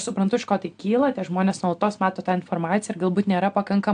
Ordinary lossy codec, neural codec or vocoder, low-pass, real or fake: AAC, 64 kbps; none; 9.9 kHz; real